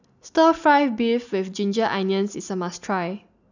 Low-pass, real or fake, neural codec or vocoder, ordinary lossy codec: 7.2 kHz; real; none; none